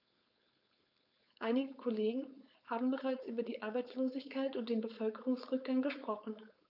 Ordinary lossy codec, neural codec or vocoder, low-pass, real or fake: none; codec, 16 kHz, 4.8 kbps, FACodec; 5.4 kHz; fake